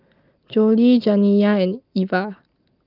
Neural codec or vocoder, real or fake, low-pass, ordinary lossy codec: none; real; 5.4 kHz; Opus, 32 kbps